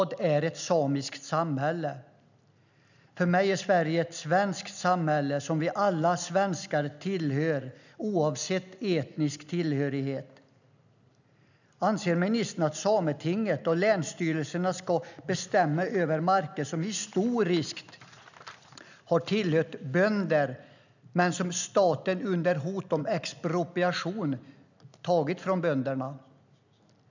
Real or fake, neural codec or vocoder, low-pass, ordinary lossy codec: real; none; 7.2 kHz; none